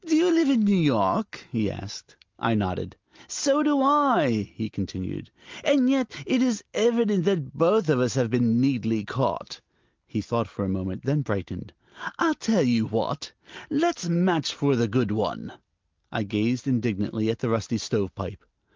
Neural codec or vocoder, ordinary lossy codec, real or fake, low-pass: none; Opus, 32 kbps; real; 7.2 kHz